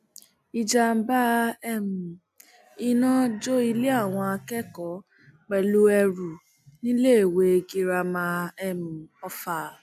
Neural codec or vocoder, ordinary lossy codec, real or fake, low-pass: none; none; real; 14.4 kHz